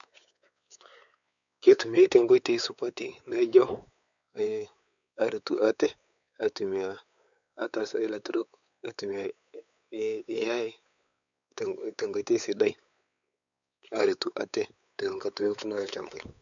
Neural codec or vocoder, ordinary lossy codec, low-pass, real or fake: codec, 16 kHz, 4 kbps, X-Codec, WavLM features, trained on Multilingual LibriSpeech; none; 7.2 kHz; fake